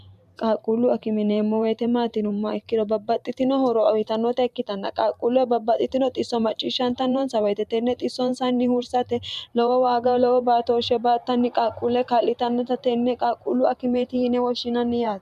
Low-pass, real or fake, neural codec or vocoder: 14.4 kHz; fake; vocoder, 44.1 kHz, 128 mel bands every 512 samples, BigVGAN v2